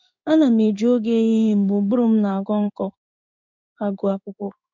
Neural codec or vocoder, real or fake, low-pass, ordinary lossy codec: codec, 16 kHz in and 24 kHz out, 1 kbps, XY-Tokenizer; fake; 7.2 kHz; MP3, 64 kbps